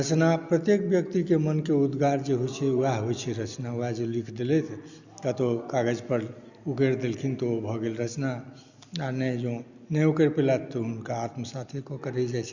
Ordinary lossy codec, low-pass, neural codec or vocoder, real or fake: Opus, 64 kbps; 7.2 kHz; none; real